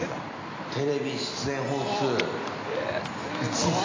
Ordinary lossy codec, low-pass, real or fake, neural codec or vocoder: AAC, 32 kbps; 7.2 kHz; real; none